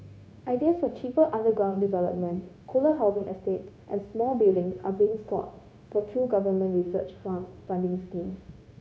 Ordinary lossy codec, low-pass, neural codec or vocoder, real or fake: none; none; codec, 16 kHz, 0.9 kbps, LongCat-Audio-Codec; fake